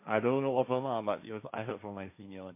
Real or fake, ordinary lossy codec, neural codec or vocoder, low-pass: fake; MP3, 24 kbps; codec, 16 kHz, 1.1 kbps, Voila-Tokenizer; 3.6 kHz